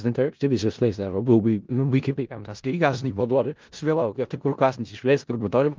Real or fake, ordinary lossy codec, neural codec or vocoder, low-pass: fake; Opus, 32 kbps; codec, 16 kHz in and 24 kHz out, 0.4 kbps, LongCat-Audio-Codec, four codebook decoder; 7.2 kHz